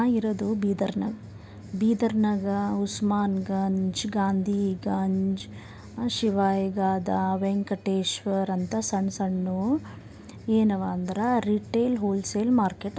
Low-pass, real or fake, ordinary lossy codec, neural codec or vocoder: none; real; none; none